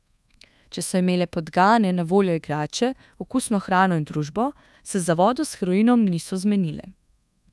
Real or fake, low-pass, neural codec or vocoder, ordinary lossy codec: fake; none; codec, 24 kHz, 1.2 kbps, DualCodec; none